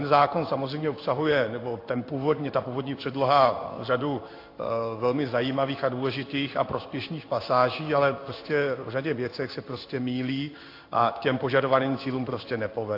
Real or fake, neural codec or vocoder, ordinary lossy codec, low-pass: fake; codec, 16 kHz in and 24 kHz out, 1 kbps, XY-Tokenizer; AAC, 32 kbps; 5.4 kHz